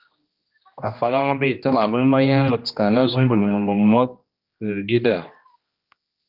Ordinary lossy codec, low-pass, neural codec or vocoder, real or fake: Opus, 32 kbps; 5.4 kHz; codec, 16 kHz, 1 kbps, X-Codec, HuBERT features, trained on general audio; fake